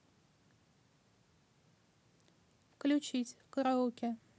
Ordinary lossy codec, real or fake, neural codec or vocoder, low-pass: none; real; none; none